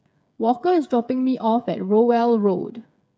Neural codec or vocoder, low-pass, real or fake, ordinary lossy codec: codec, 16 kHz, 8 kbps, FreqCodec, smaller model; none; fake; none